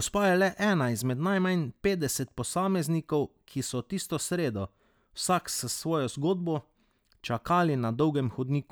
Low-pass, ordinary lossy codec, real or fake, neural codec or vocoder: none; none; real; none